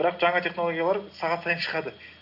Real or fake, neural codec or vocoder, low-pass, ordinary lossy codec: real; none; 5.4 kHz; none